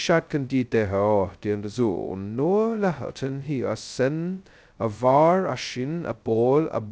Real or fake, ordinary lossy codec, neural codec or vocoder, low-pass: fake; none; codec, 16 kHz, 0.2 kbps, FocalCodec; none